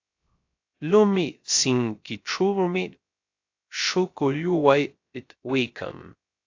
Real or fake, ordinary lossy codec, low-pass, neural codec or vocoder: fake; AAC, 48 kbps; 7.2 kHz; codec, 16 kHz, 0.3 kbps, FocalCodec